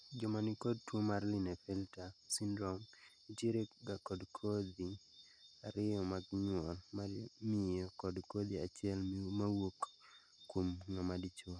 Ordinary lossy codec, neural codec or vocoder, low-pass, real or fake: none; none; 9.9 kHz; real